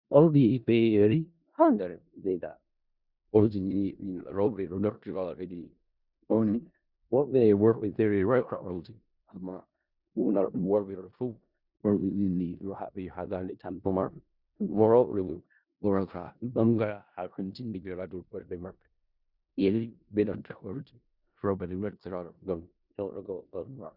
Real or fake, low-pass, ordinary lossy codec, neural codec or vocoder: fake; 5.4 kHz; Opus, 64 kbps; codec, 16 kHz in and 24 kHz out, 0.4 kbps, LongCat-Audio-Codec, four codebook decoder